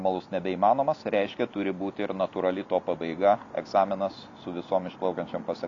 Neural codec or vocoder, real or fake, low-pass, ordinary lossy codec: none; real; 7.2 kHz; AAC, 48 kbps